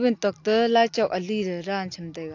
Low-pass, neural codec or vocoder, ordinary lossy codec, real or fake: 7.2 kHz; none; AAC, 48 kbps; real